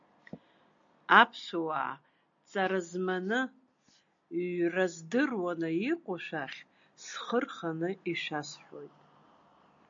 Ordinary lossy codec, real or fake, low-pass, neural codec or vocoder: AAC, 64 kbps; real; 7.2 kHz; none